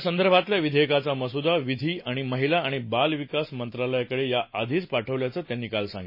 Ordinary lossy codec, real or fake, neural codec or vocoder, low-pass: MP3, 24 kbps; real; none; 5.4 kHz